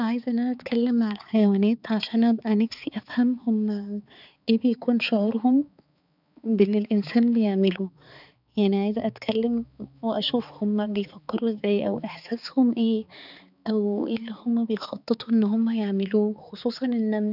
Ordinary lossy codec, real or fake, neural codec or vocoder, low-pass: none; fake; codec, 16 kHz, 4 kbps, X-Codec, HuBERT features, trained on balanced general audio; 5.4 kHz